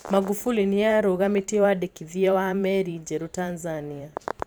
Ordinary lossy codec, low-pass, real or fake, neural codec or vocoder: none; none; fake; vocoder, 44.1 kHz, 128 mel bands every 512 samples, BigVGAN v2